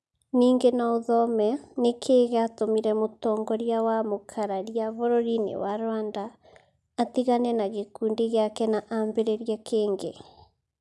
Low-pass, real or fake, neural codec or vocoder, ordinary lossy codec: none; real; none; none